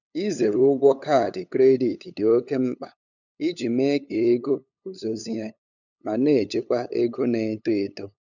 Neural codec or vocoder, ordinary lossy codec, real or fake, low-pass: codec, 16 kHz, 8 kbps, FunCodec, trained on LibriTTS, 25 frames a second; none; fake; 7.2 kHz